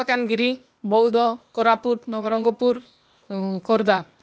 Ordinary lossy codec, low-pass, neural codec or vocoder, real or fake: none; none; codec, 16 kHz, 0.8 kbps, ZipCodec; fake